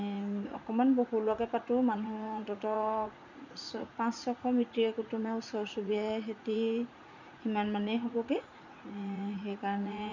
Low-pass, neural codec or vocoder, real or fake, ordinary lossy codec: 7.2 kHz; vocoder, 44.1 kHz, 80 mel bands, Vocos; fake; none